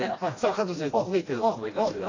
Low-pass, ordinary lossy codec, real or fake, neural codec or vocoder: 7.2 kHz; AAC, 32 kbps; fake; codec, 16 kHz, 1 kbps, FreqCodec, smaller model